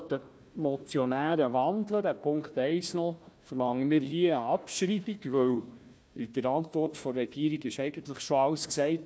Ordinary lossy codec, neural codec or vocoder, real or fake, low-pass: none; codec, 16 kHz, 1 kbps, FunCodec, trained on Chinese and English, 50 frames a second; fake; none